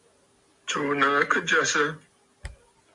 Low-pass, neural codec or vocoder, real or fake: 10.8 kHz; none; real